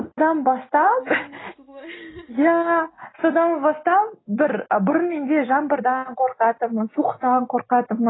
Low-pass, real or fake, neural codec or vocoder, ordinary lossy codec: 7.2 kHz; real; none; AAC, 16 kbps